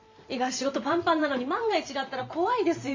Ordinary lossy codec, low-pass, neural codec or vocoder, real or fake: MP3, 64 kbps; 7.2 kHz; vocoder, 44.1 kHz, 128 mel bands every 512 samples, BigVGAN v2; fake